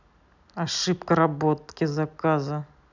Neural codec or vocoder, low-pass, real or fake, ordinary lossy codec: none; 7.2 kHz; real; none